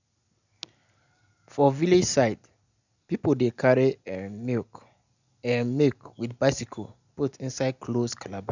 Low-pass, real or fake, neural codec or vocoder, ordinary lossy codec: 7.2 kHz; real; none; none